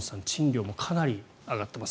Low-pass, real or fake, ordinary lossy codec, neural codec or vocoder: none; real; none; none